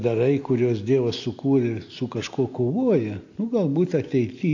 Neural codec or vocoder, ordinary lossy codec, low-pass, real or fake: none; AAC, 48 kbps; 7.2 kHz; real